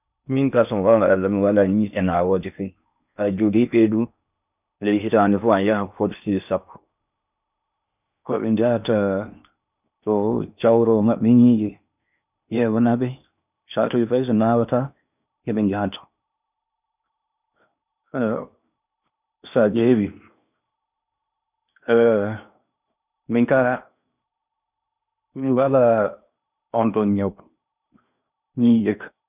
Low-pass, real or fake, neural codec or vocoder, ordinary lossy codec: 3.6 kHz; fake; codec, 16 kHz in and 24 kHz out, 0.8 kbps, FocalCodec, streaming, 65536 codes; none